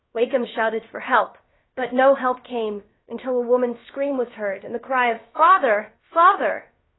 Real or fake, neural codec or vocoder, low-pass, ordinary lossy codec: fake; codec, 16 kHz, about 1 kbps, DyCAST, with the encoder's durations; 7.2 kHz; AAC, 16 kbps